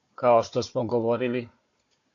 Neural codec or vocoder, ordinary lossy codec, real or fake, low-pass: codec, 16 kHz, 4 kbps, FunCodec, trained on Chinese and English, 50 frames a second; AAC, 48 kbps; fake; 7.2 kHz